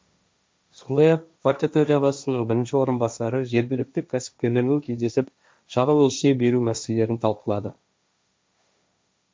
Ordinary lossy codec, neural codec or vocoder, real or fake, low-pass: none; codec, 16 kHz, 1.1 kbps, Voila-Tokenizer; fake; none